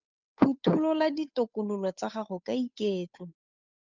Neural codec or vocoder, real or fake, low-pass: codec, 16 kHz, 8 kbps, FunCodec, trained on Chinese and English, 25 frames a second; fake; 7.2 kHz